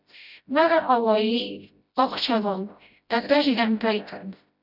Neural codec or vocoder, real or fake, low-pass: codec, 16 kHz, 0.5 kbps, FreqCodec, smaller model; fake; 5.4 kHz